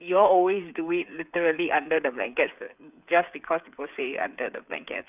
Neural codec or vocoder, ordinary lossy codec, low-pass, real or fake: codec, 16 kHz, 8 kbps, FreqCodec, smaller model; none; 3.6 kHz; fake